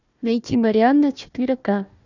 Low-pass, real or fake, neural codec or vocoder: 7.2 kHz; fake; codec, 16 kHz, 1 kbps, FunCodec, trained on Chinese and English, 50 frames a second